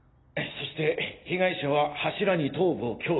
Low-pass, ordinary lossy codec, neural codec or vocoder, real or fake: 7.2 kHz; AAC, 16 kbps; none; real